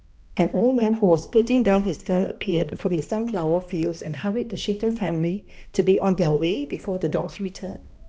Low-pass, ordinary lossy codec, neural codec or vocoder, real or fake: none; none; codec, 16 kHz, 1 kbps, X-Codec, HuBERT features, trained on balanced general audio; fake